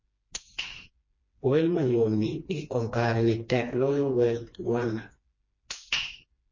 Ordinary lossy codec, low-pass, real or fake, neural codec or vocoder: MP3, 32 kbps; 7.2 kHz; fake; codec, 16 kHz, 2 kbps, FreqCodec, smaller model